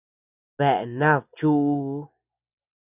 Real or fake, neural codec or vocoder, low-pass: real; none; 3.6 kHz